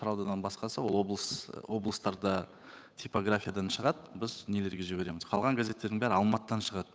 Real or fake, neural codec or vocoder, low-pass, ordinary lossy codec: fake; codec, 16 kHz, 8 kbps, FunCodec, trained on Chinese and English, 25 frames a second; none; none